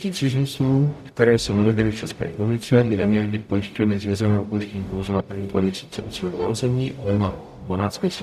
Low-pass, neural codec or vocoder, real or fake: 14.4 kHz; codec, 44.1 kHz, 0.9 kbps, DAC; fake